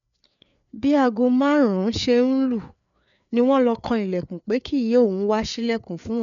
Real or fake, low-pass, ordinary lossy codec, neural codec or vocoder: fake; 7.2 kHz; none; codec, 16 kHz, 4 kbps, FreqCodec, larger model